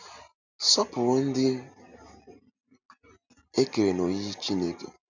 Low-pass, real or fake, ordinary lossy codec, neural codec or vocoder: 7.2 kHz; real; none; none